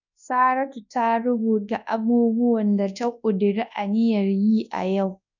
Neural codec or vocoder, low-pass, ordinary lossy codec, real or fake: codec, 24 kHz, 0.9 kbps, WavTokenizer, large speech release; 7.2 kHz; none; fake